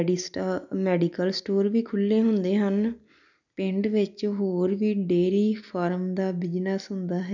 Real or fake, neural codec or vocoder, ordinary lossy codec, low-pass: real; none; none; 7.2 kHz